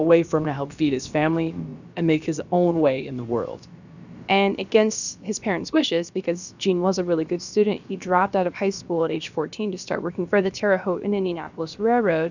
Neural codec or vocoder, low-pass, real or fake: codec, 16 kHz, about 1 kbps, DyCAST, with the encoder's durations; 7.2 kHz; fake